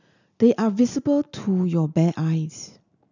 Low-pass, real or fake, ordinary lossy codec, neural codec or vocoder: 7.2 kHz; real; none; none